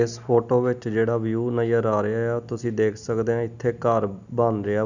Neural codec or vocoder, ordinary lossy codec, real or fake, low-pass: none; none; real; 7.2 kHz